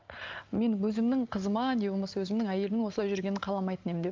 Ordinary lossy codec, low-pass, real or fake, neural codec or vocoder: Opus, 24 kbps; 7.2 kHz; real; none